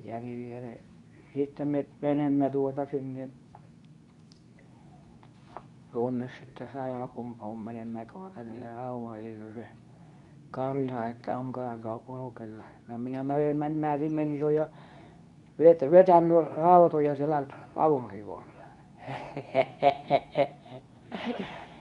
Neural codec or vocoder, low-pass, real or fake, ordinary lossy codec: codec, 24 kHz, 0.9 kbps, WavTokenizer, medium speech release version 2; 10.8 kHz; fake; none